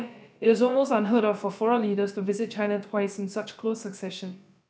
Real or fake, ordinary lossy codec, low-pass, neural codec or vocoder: fake; none; none; codec, 16 kHz, about 1 kbps, DyCAST, with the encoder's durations